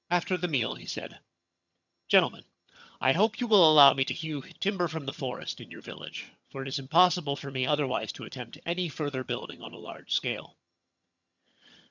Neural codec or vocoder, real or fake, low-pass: vocoder, 22.05 kHz, 80 mel bands, HiFi-GAN; fake; 7.2 kHz